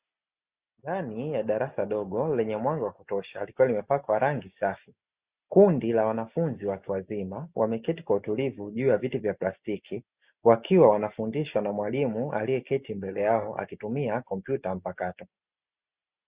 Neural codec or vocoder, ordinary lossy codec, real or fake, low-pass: none; Opus, 64 kbps; real; 3.6 kHz